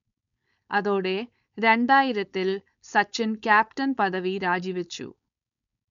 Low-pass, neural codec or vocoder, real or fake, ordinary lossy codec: 7.2 kHz; codec, 16 kHz, 4.8 kbps, FACodec; fake; none